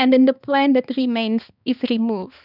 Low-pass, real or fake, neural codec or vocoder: 5.4 kHz; fake; codec, 16 kHz, 2 kbps, X-Codec, HuBERT features, trained on balanced general audio